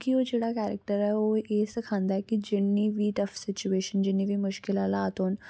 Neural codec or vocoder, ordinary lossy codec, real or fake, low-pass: none; none; real; none